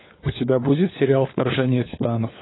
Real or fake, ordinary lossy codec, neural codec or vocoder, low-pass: fake; AAC, 16 kbps; codec, 16 kHz, 4 kbps, FunCodec, trained on LibriTTS, 50 frames a second; 7.2 kHz